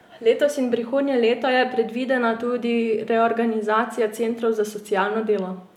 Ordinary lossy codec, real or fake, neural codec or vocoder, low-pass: none; real; none; 19.8 kHz